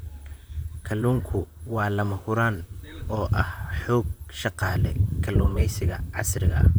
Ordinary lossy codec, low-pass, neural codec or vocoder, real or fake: none; none; vocoder, 44.1 kHz, 128 mel bands, Pupu-Vocoder; fake